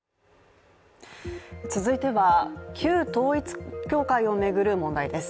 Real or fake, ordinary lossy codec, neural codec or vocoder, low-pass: real; none; none; none